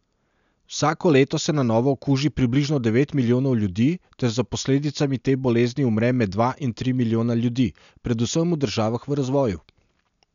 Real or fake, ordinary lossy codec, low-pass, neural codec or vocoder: real; MP3, 96 kbps; 7.2 kHz; none